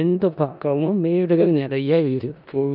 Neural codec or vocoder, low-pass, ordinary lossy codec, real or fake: codec, 16 kHz in and 24 kHz out, 0.4 kbps, LongCat-Audio-Codec, four codebook decoder; 5.4 kHz; none; fake